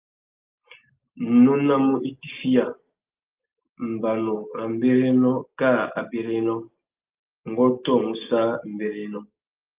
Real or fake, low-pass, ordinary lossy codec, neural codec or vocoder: real; 3.6 kHz; Opus, 32 kbps; none